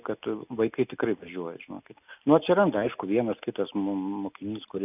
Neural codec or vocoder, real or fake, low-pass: none; real; 3.6 kHz